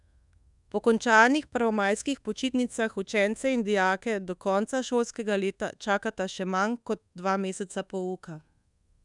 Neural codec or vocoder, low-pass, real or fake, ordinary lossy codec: codec, 24 kHz, 1.2 kbps, DualCodec; 10.8 kHz; fake; none